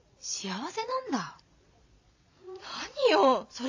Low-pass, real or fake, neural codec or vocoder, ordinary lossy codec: 7.2 kHz; fake; vocoder, 22.05 kHz, 80 mel bands, Vocos; AAC, 48 kbps